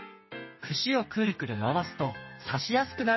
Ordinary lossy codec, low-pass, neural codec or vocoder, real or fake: MP3, 24 kbps; 7.2 kHz; codec, 32 kHz, 1.9 kbps, SNAC; fake